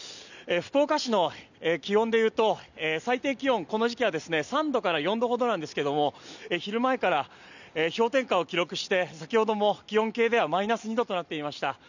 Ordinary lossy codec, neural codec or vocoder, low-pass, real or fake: none; none; 7.2 kHz; real